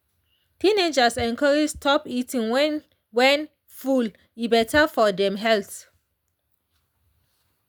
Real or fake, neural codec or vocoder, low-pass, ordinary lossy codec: real; none; none; none